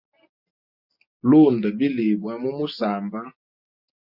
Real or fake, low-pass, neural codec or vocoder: real; 5.4 kHz; none